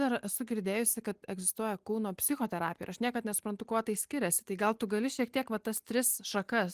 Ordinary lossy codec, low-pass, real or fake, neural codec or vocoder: Opus, 24 kbps; 14.4 kHz; real; none